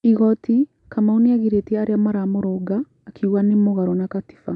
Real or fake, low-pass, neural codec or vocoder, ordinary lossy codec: real; 10.8 kHz; none; none